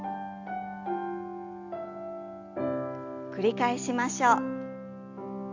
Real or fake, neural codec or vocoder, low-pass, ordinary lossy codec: real; none; 7.2 kHz; Opus, 64 kbps